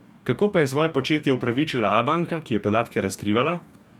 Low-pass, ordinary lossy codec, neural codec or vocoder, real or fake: 19.8 kHz; none; codec, 44.1 kHz, 2.6 kbps, DAC; fake